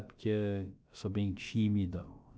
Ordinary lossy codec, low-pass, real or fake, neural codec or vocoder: none; none; fake; codec, 16 kHz, about 1 kbps, DyCAST, with the encoder's durations